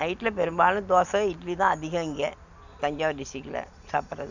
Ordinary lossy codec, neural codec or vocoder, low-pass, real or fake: none; none; 7.2 kHz; real